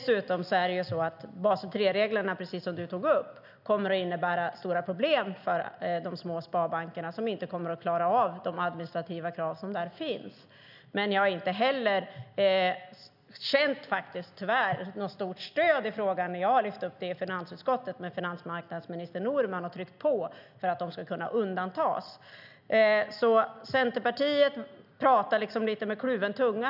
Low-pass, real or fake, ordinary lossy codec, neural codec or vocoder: 5.4 kHz; real; none; none